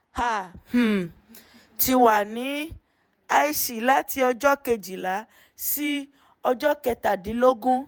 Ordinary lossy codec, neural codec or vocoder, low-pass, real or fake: none; vocoder, 48 kHz, 128 mel bands, Vocos; none; fake